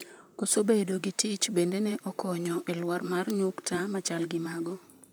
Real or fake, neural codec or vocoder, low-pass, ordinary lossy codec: fake; vocoder, 44.1 kHz, 128 mel bands, Pupu-Vocoder; none; none